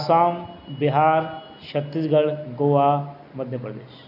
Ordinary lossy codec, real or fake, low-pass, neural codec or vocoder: AAC, 48 kbps; real; 5.4 kHz; none